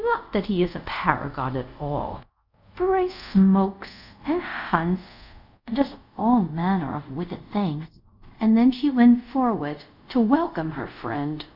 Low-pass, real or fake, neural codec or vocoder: 5.4 kHz; fake; codec, 24 kHz, 0.5 kbps, DualCodec